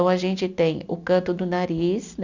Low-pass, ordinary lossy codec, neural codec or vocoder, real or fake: 7.2 kHz; none; none; real